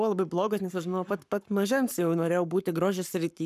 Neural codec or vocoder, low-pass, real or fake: codec, 44.1 kHz, 7.8 kbps, Pupu-Codec; 14.4 kHz; fake